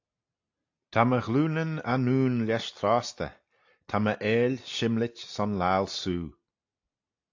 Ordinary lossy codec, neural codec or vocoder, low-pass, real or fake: AAC, 48 kbps; none; 7.2 kHz; real